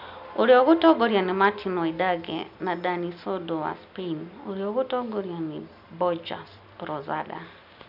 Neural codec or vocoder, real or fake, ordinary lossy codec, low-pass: none; real; none; 5.4 kHz